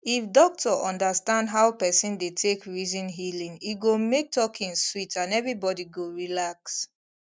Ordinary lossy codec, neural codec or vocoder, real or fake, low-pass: none; none; real; none